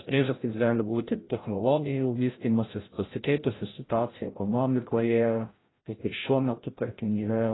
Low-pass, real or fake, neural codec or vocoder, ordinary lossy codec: 7.2 kHz; fake; codec, 16 kHz, 0.5 kbps, FreqCodec, larger model; AAC, 16 kbps